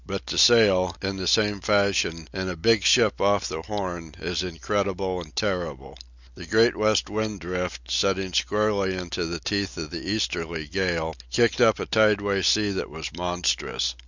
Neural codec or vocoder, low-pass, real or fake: none; 7.2 kHz; real